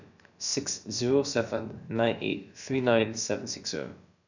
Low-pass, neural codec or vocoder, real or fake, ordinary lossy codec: 7.2 kHz; codec, 16 kHz, about 1 kbps, DyCAST, with the encoder's durations; fake; none